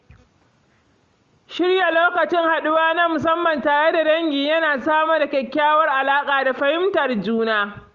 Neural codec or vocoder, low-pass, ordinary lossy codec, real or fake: none; 7.2 kHz; Opus, 32 kbps; real